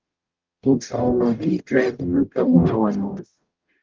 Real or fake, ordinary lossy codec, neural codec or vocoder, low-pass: fake; Opus, 24 kbps; codec, 44.1 kHz, 0.9 kbps, DAC; 7.2 kHz